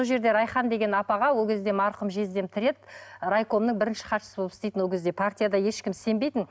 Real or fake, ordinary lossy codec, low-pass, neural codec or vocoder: real; none; none; none